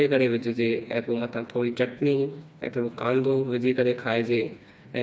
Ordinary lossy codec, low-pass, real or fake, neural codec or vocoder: none; none; fake; codec, 16 kHz, 2 kbps, FreqCodec, smaller model